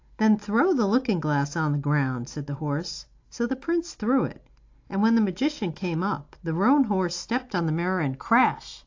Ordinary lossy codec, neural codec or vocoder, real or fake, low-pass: AAC, 48 kbps; none; real; 7.2 kHz